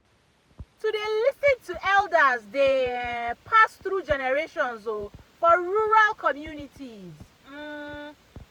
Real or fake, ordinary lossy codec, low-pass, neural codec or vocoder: fake; none; 19.8 kHz; vocoder, 44.1 kHz, 128 mel bands every 512 samples, BigVGAN v2